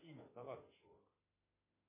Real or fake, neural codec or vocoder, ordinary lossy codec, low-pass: fake; autoencoder, 48 kHz, 32 numbers a frame, DAC-VAE, trained on Japanese speech; MP3, 16 kbps; 3.6 kHz